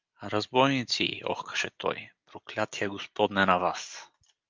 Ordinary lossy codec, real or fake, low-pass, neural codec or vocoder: Opus, 32 kbps; real; 7.2 kHz; none